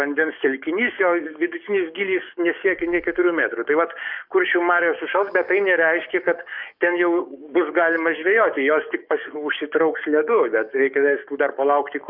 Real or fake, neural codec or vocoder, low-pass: fake; codec, 44.1 kHz, 7.8 kbps, DAC; 5.4 kHz